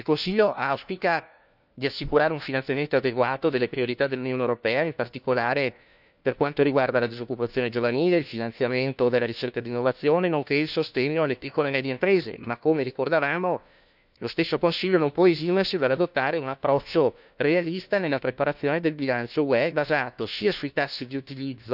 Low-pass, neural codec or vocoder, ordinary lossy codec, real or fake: 5.4 kHz; codec, 16 kHz, 1 kbps, FunCodec, trained on LibriTTS, 50 frames a second; none; fake